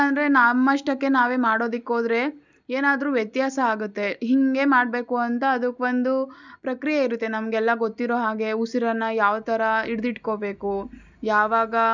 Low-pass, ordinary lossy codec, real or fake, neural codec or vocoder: 7.2 kHz; none; real; none